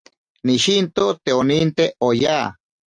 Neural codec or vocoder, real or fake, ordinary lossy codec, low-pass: none; real; AAC, 64 kbps; 9.9 kHz